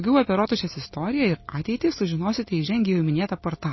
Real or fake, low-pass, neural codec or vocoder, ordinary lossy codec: real; 7.2 kHz; none; MP3, 24 kbps